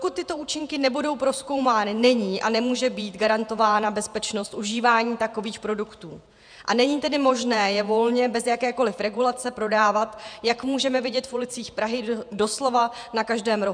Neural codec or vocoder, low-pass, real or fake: vocoder, 48 kHz, 128 mel bands, Vocos; 9.9 kHz; fake